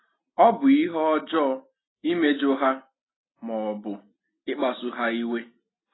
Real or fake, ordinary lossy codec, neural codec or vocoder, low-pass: real; AAC, 16 kbps; none; 7.2 kHz